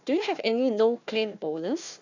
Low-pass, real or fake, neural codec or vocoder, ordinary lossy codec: 7.2 kHz; fake; codec, 16 kHz, 1 kbps, FunCodec, trained on Chinese and English, 50 frames a second; none